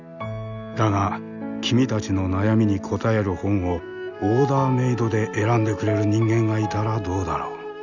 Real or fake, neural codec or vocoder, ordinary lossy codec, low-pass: real; none; none; 7.2 kHz